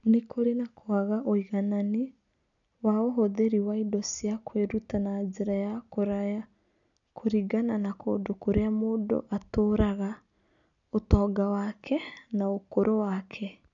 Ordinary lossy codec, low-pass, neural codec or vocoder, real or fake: none; 7.2 kHz; none; real